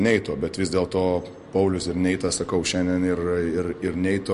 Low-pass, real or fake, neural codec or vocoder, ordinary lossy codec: 14.4 kHz; real; none; MP3, 48 kbps